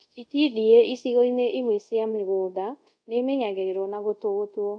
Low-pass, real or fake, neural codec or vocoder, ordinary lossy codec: 9.9 kHz; fake; codec, 24 kHz, 0.5 kbps, DualCodec; none